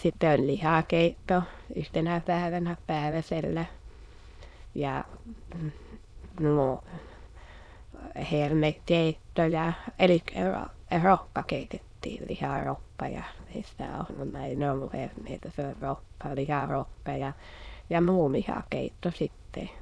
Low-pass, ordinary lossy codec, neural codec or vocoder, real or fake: none; none; autoencoder, 22.05 kHz, a latent of 192 numbers a frame, VITS, trained on many speakers; fake